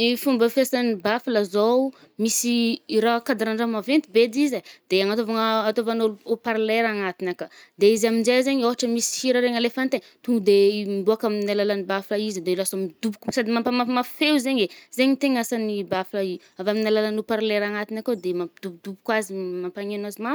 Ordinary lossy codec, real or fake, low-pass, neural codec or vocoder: none; real; none; none